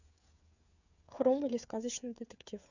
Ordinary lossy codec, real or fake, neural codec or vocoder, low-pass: none; fake; codec, 16 kHz, 16 kbps, FreqCodec, smaller model; 7.2 kHz